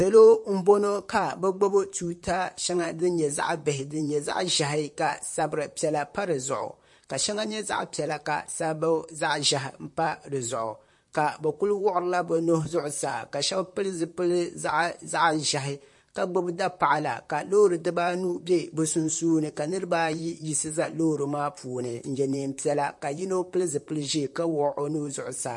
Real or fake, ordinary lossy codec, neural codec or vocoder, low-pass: fake; MP3, 48 kbps; vocoder, 44.1 kHz, 128 mel bands, Pupu-Vocoder; 10.8 kHz